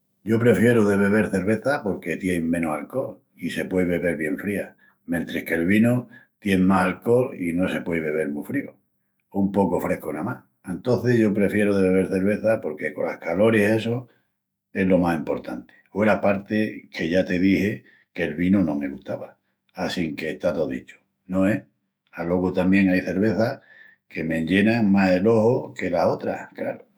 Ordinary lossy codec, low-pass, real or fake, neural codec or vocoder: none; none; fake; autoencoder, 48 kHz, 128 numbers a frame, DAC-VAE, trained on Japanese speech